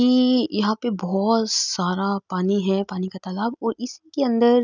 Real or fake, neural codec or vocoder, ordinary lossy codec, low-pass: real; none; none; 7.2 kHz